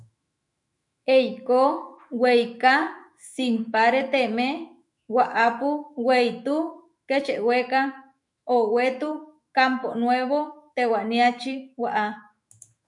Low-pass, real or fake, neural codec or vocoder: 10.8 kHz; fake; autoencoder, 48 kHz, 128 numbers a frame, DAC-VAE, trained on Japanese speech